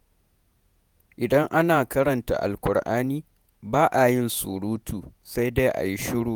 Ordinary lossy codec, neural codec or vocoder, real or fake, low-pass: none; vocoder, 48 kHz, 128 mel bands, Vocos; fake; none